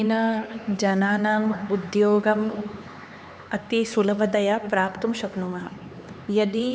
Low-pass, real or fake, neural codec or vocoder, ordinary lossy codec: none; fake; codec, 16 kHz, 4 kbps, X-Codec, HuBERT features, trained on LibriSpeech; none